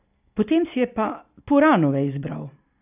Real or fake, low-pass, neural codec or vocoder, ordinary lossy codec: real; 3.6 kHz; none; none